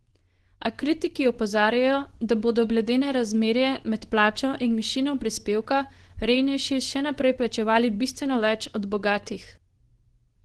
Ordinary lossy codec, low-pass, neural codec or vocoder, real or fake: Opus, 16 kbps; 10.8 kHz; codec, 24 kHz, 0.9 kbps, WavTokenizer, medium speech release version 2; fake